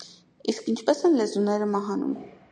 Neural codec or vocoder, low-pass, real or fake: none; 9.9 kHz; real